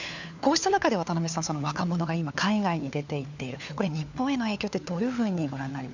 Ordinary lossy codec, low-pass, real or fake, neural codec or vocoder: none; 7.2 kHz; fake; codec, 16 kHz, 4 kbps, FunCodec, trained on LibriTTS, 50 frames a second